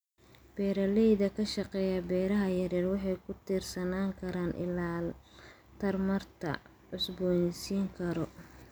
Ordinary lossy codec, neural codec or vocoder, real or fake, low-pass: none; none; real; none